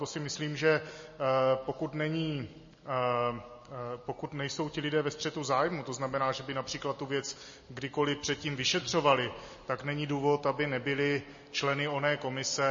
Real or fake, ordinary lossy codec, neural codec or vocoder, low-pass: real; MP3, 32 kbps; none; 7.2 kHz